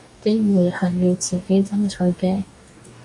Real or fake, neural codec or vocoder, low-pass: fake; codec, 44.1 kHz, 2.6 kbps, DAC; 10.8 kHz